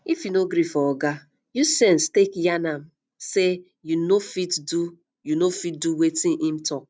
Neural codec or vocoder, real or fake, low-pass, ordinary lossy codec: none; real; none; none